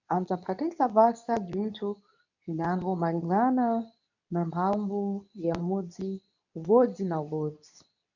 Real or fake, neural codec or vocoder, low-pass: fake; codec, 24 kHz, 0.9 kbps, WavTokenizer, medium speech release version 2; 7.2 kHz